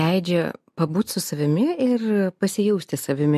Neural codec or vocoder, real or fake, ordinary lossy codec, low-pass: none; real; MP3, 64 kbps; 14.4 kHz